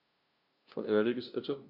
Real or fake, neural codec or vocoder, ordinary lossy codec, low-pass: fake; codec, 16 kHz, 0.5 kbps, FunCodec, trained on LibriTTS, 25 frames a second; none; 5.4 kHz